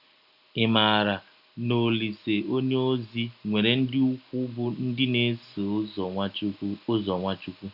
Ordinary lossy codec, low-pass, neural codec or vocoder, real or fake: none; 5.4 kHz; none; real